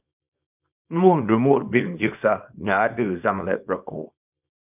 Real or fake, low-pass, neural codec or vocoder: fake; 3.6 kHz; codec, 24 kHz, 0.9 kbps, WavTokenizer, small release